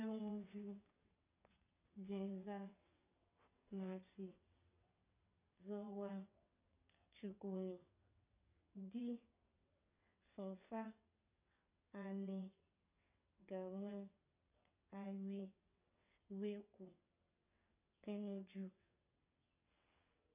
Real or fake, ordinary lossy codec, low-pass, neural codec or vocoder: fake; none; 3.6 kHz; vocoder, 22.05 kHz, 80 mel bands, WaveNeXt